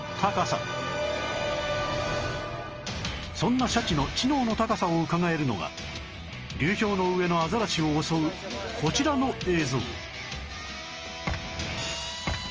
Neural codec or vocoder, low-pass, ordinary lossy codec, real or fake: none; 7.2 kHz; Opus, 24 kbps; real